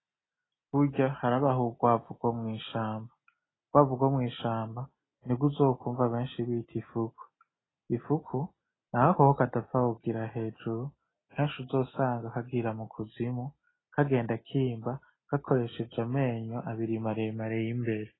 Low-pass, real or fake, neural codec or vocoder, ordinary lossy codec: 7.2 kHz; real; none; AAC, 16 kbps